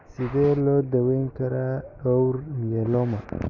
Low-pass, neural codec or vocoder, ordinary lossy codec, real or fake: 7.2 kHz; none; none; real